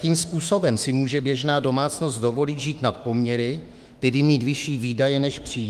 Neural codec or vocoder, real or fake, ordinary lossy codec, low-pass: autoencoder, 48 kHz, 32 numbers a frame, DAC-VAE, trained on Japanese speech; fake; Opus, 32 kbps; 14.4 kHz